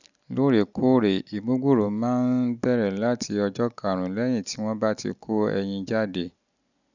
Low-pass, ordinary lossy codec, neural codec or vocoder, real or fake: 7.2 kHz; none; none; real